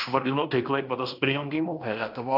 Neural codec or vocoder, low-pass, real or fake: codec, 16 kHz in and 24 kHz out, 0.9 kbps, LongCat-Audio-Codec, fine tuned four codebook decoder; 5.4 kHz; fake